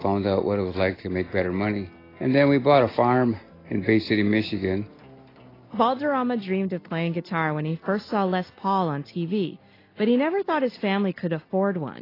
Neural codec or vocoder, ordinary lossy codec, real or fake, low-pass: none; AAC, 24 kbps; real; 5.4 kHz